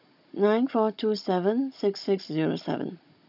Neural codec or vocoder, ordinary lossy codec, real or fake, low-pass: codec, 16 kHz, 16 kbps, FunCodec, trained on Chinese and English, 50 frames a second; none; fake; 5.4 kHz